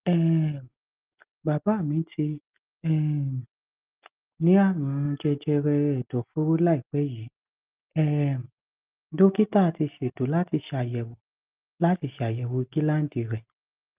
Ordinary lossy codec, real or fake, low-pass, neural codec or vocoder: Opus, 24 kbps; real; 3.6 kHz; none